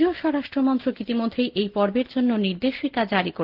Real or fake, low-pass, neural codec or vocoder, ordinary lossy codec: real; 5.4 kHz; none; Opus, 16 kbps